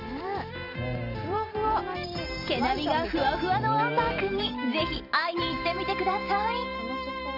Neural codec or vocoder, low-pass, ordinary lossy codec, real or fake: vocoder, 44.1 kHz, 128 mel bands every 512 samples, BigVGAN v2; 5.4 kHz; none; fake